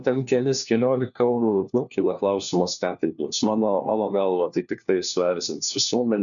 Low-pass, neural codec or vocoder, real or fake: 7.2 kHz; codec, 16 kHz, 1 kbps, FunCodec, trained on LibriTTS, 50 frames a second; fake